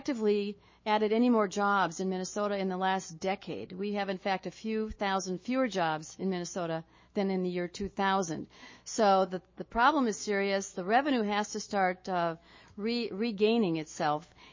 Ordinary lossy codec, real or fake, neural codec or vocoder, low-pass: MP3, 32 kbps; fake; autoencoder, 48 kHz, 128 numbers a frame, DAC-VAE, trained on Japanese speech; 7.2 kHz